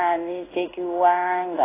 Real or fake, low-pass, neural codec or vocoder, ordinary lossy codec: fake; 3.6 kHz; codec, 16 kHz in and 24 kHz out, 1 kbps, XY-Tokenizer; AAC, 16 kbps